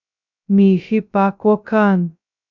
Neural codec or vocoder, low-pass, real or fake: codec, 16 kHz, 0.2 kbps, FocalCodec; 7.2 kHz; fake